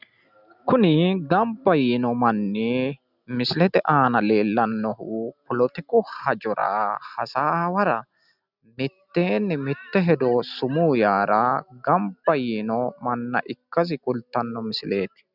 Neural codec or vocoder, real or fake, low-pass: none; real; 5.4 kHz